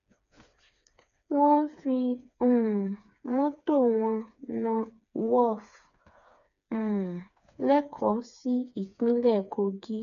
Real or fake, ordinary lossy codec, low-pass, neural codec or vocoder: fake; none; 7.2 kHz; codec, 16 kHz, 4 kbps, FreqCodec, smaller model